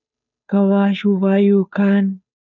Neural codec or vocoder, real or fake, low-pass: codec, 16 kHz, 2 kbps, FunCodec, trained on Chinese and English, 25 frames a second; fake; 7.2 kHz